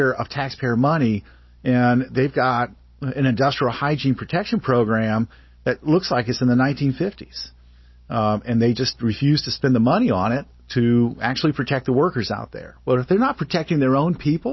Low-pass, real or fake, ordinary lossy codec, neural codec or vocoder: 7.2 kHz; real; MP3, 24 kbps; none